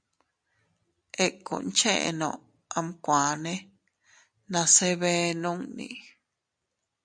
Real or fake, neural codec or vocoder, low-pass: real; none; 9.9 kHz